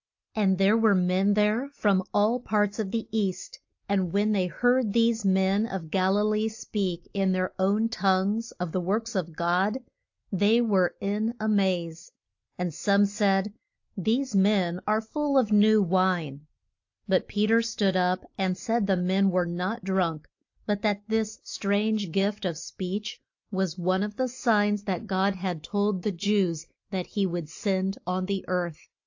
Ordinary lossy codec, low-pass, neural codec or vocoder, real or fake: AAC, 48 kbps; 7.2 kHz; none; real